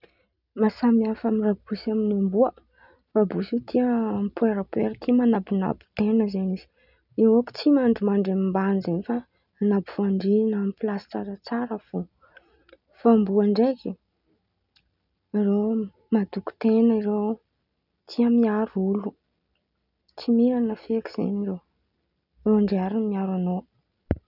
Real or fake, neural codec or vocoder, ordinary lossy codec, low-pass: real; none; none; 5.4 kHz